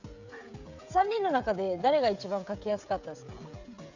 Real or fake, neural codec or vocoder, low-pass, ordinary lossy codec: fake; codec, 16 kHz, 16 kbps, FreqCodec, smaller model; 7.2 kHz; none